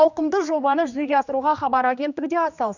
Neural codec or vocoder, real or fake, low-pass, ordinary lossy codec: codec, 16 kHz, 2 kbps, X-Codec, HuBERT features, trained on general audio; fake; 7.2 kHz; none